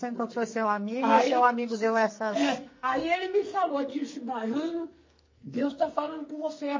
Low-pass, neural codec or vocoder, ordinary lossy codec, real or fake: 7.2 kHz; codec, 44.1 kHz, 2.6 kbps, SNAC; MP3, 32 kbps; fake